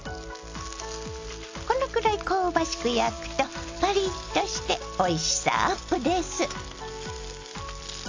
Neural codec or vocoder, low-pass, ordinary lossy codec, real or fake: none; 7.2 kHz; AAC, 48 kbps; real